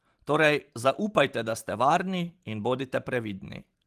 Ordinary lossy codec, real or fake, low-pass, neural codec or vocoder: Opus, 24 kbps; fake; 14.4 kHz; vocoder, 44.1 kHz, 128 mel bands every 512 samples, BigVGAN v2